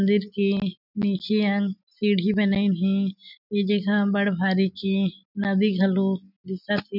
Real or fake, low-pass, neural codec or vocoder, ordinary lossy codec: real; 5.4 kHz; none; none